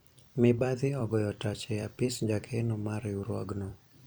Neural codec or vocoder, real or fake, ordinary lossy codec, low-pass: vocoder, 44.1 kHz, 128 mel bands every 256 samples, BigVGAN v2; fake; none; none